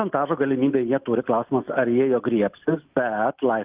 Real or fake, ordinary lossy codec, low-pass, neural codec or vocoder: real; Opus, 24 kbps; 3.6 kHz; none